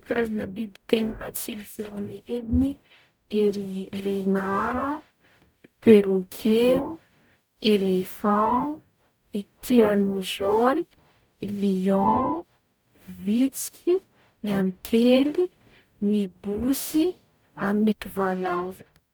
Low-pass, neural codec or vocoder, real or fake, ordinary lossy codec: none; codec, 44.1 kHz, 0.9 kbps, DAC; fake; none